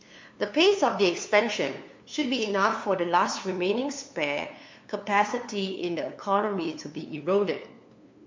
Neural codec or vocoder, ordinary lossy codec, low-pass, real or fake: codec, 16 kHz, 2 kbps, FunCodec, trained on LibriTTS, 25 frames a second; MP3, 48 kbps; 7.2 kHz; fake